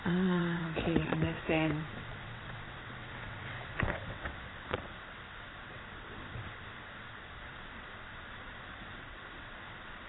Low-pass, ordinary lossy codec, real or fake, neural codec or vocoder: 7.2 kHz; AAC, 16 kbps; fake; vocoder, 22.05 kHz, 80 mel bands, WaveNeXt